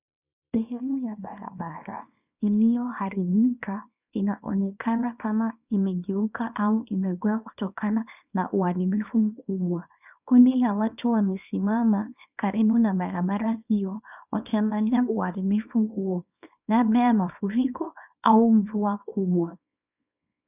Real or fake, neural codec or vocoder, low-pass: fake; codec, 24 kHz, 0.9 kbps, WavTokenizer, small release; 3.6 kHz